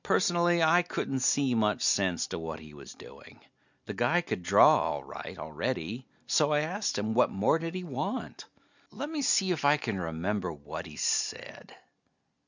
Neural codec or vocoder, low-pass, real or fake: none; 7.2 kHz; real